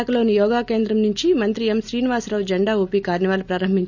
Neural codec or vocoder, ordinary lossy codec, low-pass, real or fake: none; none; 7.2 kHz; real